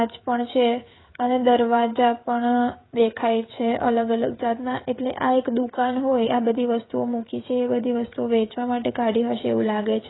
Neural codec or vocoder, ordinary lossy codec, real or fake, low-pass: codec, 16 kHz, 16 kbps, FreqCodec, smaller model; AAC, 16 kbps; fake; 7.2 kHz